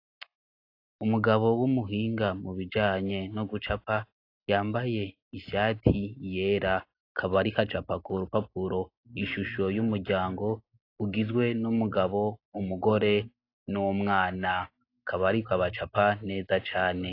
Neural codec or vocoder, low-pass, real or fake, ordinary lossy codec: none; 5.4 kHz; real; AAC, 32 kbps